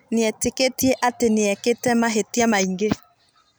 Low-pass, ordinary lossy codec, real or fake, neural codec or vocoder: none; none; real; none